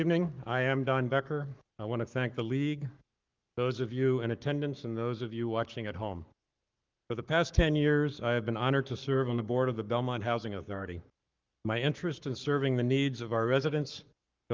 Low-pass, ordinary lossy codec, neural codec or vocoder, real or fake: 7.2 kHz; Opus, 24 kbps; codec, 44.1 kHz, 7.8 kbps, Pupu-Codec; fake